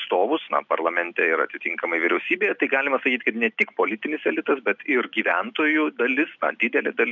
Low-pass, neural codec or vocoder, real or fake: 7.2 kHz; none; real